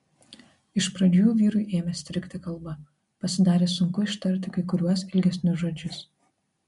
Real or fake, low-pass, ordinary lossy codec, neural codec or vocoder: real; 10.8 kHz; MP3, 64 kbps; none